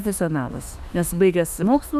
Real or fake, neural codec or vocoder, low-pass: fake; autoencoder, 48 kHz, 32 numbers a frame, DAC-VAE, trained on Japanese speech; 14.4 kHz